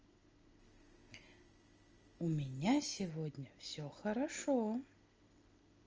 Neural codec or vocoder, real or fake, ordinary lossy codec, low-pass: none; real; Opus, 24 kbps; 7.2 kHz